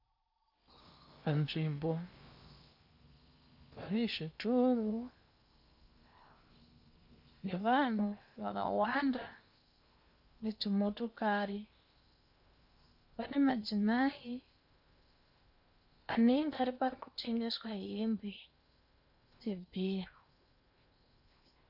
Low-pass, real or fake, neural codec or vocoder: 5.4 kHz; fake; codec, 16 kHz in and 24 kHz out, 0.8 kbps, FocalCodec, streaming, 65536 codes